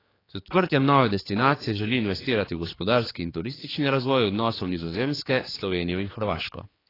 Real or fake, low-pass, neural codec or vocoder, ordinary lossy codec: fake; 5.4 kHz; codec, 16 kHz, 4 kbps, X-Codec, HuBERT features, trained on general audio; AAC, 24 kbps